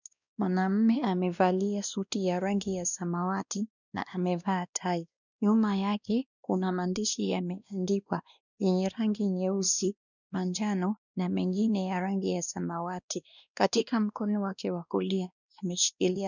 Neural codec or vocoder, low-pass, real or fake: codec, 16 kHz, 1 kbps, X-Codec, WavLM features, trained on Multilingual LibriSpeech; 7.2 kHz; fake